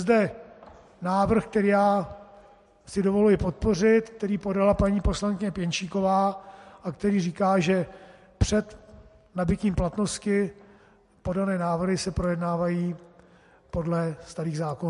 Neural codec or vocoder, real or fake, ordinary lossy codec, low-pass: none; real; MP3, 48 kbps; 14.4 kHz